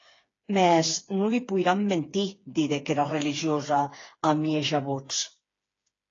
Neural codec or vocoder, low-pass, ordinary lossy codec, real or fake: codec, 16 kHz, 4 kbps, FreqCodec, smaller model; 7.2 kHz; AAC, 32 kbps; fake